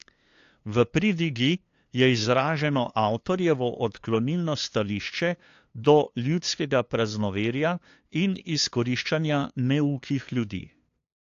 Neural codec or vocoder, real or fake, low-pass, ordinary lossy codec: codec, 16 kHz, 2 kbps, FunCodec, trained on LibriTTS, 25 frames a second; fake; 7.2 kHz; AAC, 48 kbps